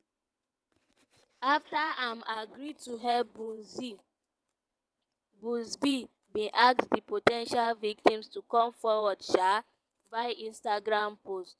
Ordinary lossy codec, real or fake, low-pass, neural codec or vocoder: none; fake; none; vocoder, 22.05 kHz, 80 mel bands, WaveNeXt